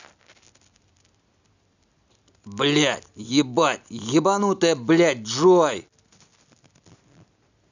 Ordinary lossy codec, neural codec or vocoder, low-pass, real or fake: none; none; 7.2 kHz; real